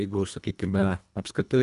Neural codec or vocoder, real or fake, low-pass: codec, 24 kHz, 1.5 kbps, HILCodec; fake; 10.8 kHz